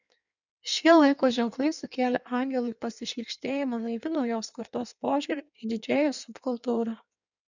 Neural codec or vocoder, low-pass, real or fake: codec, 16 kHz in and 24 kHz out, 1.1 kbps, FireRedTTS-2 codec; 7.2 kHz; fake